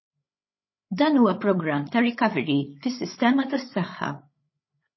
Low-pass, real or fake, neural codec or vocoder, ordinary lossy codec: 7.2 kHz; fake; codec, 16 kHz, 8 kbps, FreqCodec, larger model; MP3, 24 kbps